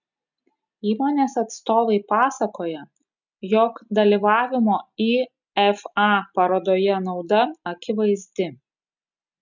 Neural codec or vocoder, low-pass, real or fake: none; 7.2 kHz; real